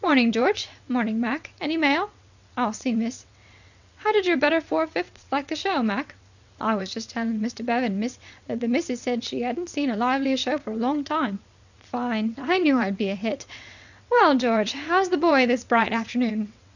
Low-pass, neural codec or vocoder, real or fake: 7.2 kHz; vocoder, 22.05 kHz, 80 mel bands, WaveNeXt; fake